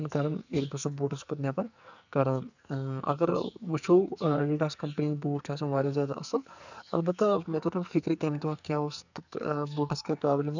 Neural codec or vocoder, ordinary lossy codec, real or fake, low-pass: codec, 44.1 kHz, 2.6 kbps, SNAC; none; fake; 7.2 kHz